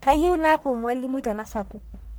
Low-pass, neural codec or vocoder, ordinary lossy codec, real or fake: none; codec, 44.1 kHz, 1.7 kbps, Pupu-Codec; none; fake